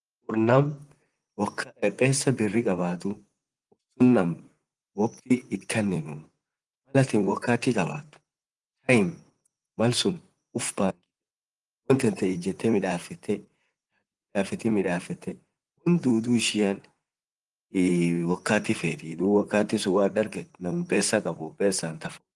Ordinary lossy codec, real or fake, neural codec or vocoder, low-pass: Opus, 24 kbps; fake; vocoder, 44.1 kHz, 128 mel bands, Pupu-Vocoder; 10.8 kHz